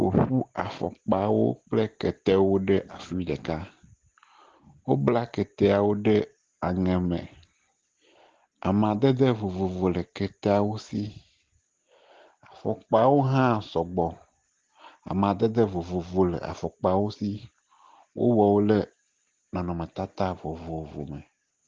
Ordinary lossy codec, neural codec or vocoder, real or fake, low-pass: Opus, 16 kbps; none; real; 7.2 kHz